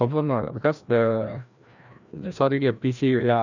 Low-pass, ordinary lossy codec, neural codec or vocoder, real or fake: 7.2 kHz; none; codec, 16 kHz, 1 kbps, FreqCodec, larger model; fake